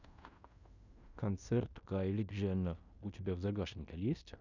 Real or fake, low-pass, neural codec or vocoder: fake; 7.2 kHz; codec, 16 kHz in and 24 kHz out, 0.9 kbps, LongCat-Audio-Codec, fine tuned four codebook decoder